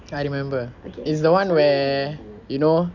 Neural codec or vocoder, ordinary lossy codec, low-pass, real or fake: none; none; 7.2 kHz; real